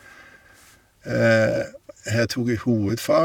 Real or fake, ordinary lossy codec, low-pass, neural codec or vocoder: fake; none; 19.8 kHz; vocoder, 44.1 kHz, 128 mel bands, Pupu-Vocoder